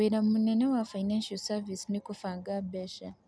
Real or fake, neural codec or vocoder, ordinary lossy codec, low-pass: real; none; none; none